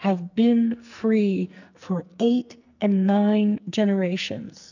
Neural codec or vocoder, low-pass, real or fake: codec, 44.1 kHz, 2.6 kbps, SNAC; 7.2 kHz; fake